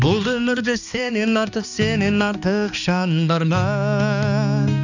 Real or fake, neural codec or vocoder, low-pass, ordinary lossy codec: fake; codec, 16 kHz, 2 kbps, X-Codec, HuBERT features, trained on balanced general audio; 7.2 kHz; none